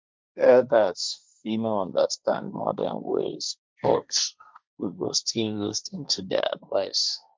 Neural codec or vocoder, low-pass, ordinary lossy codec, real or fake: codec, 16 kHz, 1.1 kbps, Voila-Tokenizer; none; none; fake